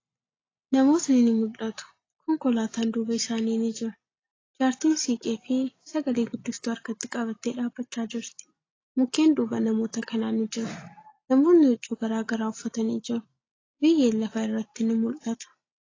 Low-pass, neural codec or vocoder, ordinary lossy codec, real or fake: 7.2 kHz; none; AAC, 32 kbps; real